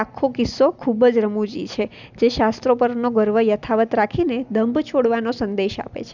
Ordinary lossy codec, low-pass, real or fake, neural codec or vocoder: none; 7.2 kHz; real; none